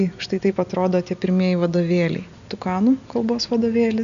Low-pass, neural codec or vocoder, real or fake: 7.2 kHz; none; real